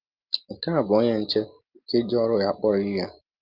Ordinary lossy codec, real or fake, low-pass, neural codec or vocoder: Opus, 24 kbps; real; 5.4 kHz; none